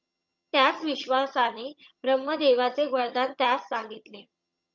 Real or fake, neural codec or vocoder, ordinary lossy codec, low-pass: fake; vocoder, 22.05 kHz, 80 mel bands, HiFi-GAN; MP3, 64 kbps; 7.2 kHz